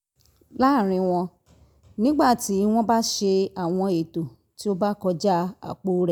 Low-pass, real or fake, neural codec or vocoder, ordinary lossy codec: none; real; none; none